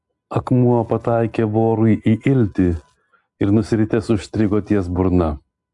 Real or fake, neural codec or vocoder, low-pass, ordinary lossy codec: real; none; 10.8 kHz; AAC, 64 kbps